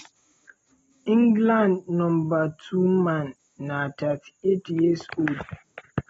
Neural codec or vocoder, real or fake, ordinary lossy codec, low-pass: none; real; AAC, 24 kbps; 19.8 kHz